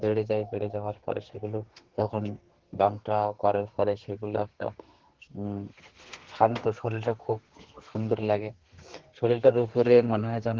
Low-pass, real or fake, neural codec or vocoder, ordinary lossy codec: 7.2 kHz; fake; codec, 32 kHz, 1.9 kbps, SNAC; Opus, 16 kbps